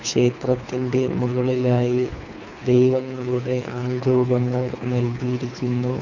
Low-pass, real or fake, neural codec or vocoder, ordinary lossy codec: 7.2 kHz; fake; codec, 24 kHz, 3 kbps, HILCodec; none